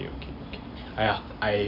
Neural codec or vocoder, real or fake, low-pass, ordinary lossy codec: none; real; 5.4 kHz; none